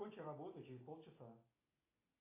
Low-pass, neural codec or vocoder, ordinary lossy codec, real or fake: 3.6 kHz; none; AAC, 16 kbps; real